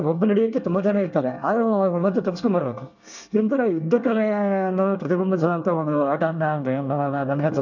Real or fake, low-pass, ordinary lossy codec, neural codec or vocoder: fake; 7.2 kHz; none; codec, 24 kHz, 1 kbps, SNAC